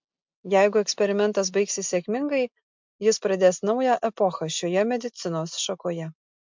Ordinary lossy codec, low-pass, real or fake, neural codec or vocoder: MP3, 64 kbps; 7.2 kHz; real; none